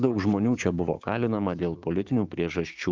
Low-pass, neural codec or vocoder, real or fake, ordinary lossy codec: 7.2 kHz; codec, 16 kHz, 6 kbps, DAC; fake; Opus, 32 kbps